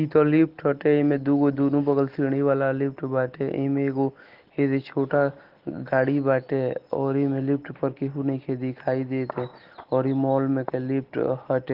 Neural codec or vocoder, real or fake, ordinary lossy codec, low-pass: none; real; Opus, 16 kbps; 5.4 kHz